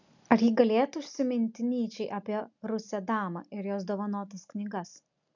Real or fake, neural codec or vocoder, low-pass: real; none; 7.2 kHz